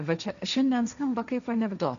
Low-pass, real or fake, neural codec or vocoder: 7.2 kHz; fake; codec, 16 kHz, 1.1 kbps, Voila-Tokenizer